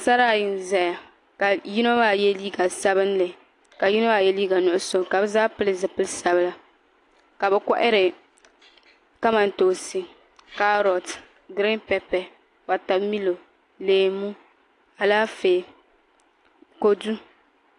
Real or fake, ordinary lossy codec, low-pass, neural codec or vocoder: real; AAC, 48 kbps; 10.8 kHz; none